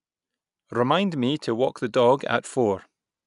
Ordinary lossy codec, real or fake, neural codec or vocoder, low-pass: none; real; none; 10.8 kHz